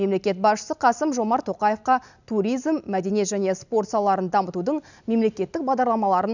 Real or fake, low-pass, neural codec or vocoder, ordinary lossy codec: real; 7.2 kHz; none; none